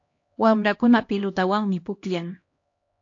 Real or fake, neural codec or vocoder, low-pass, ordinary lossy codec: fake; codec, 16 kHz, 1 kbps, X-Codec, HuBERT features, trained on LibriSpeech; 7.2 kHz; AAC, 48 kbps